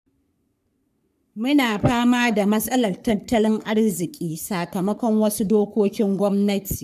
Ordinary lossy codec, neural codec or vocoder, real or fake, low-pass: none; codec, 44.1 kHz, 3.4 kbps, Pupu-Codec; fake; 14.4 kHz